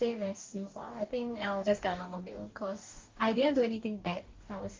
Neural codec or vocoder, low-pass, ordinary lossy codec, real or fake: codec, 44.1 kHz, 2.6 kbps, DAC; 7.2 kHz; Opus, 32 kbps; fake